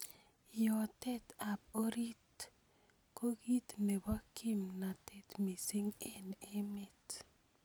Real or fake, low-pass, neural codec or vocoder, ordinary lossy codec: real; none; none; none